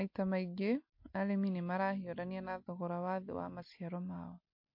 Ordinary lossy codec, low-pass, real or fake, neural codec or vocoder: MP3, 32 kbps; 5.4 kHz; real; none